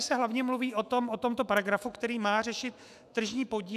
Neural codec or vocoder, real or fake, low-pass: autoencoder, 48 kHz, 128 numbers a frame, DAC-VAE, trained on Japanese speech; fake; 14.4 kHz